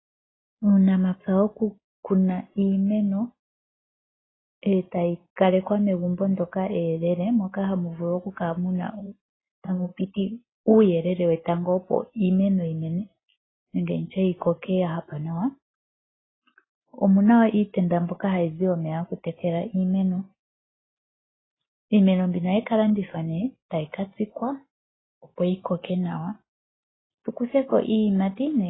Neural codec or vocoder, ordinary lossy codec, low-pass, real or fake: none; AAC, 16 kbps; 7.2 kHz; real